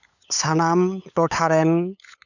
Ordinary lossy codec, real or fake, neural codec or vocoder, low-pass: none; fake; codec, 16 kHz, 8 kbps, FunCodec, trained on LibriTTS, 25 frames a second; 7.2 kHz